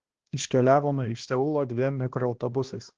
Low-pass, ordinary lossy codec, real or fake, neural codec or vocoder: 7.2 kHz; Opus, 16 kbps; fake; codec, 16 kHz, 1 kbps, X-Codec, HuBERT features, trained on balanced general audio